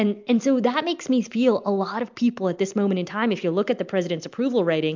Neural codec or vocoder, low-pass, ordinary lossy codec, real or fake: none; 7.2 kHz; MP3, 64 kbps; real